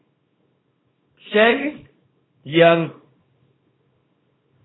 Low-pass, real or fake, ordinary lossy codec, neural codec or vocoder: 7.2 kHz; fake; AAC, 16 kbps; codec, 24 kHz, 0.9 kbps, WavTokenizer, small release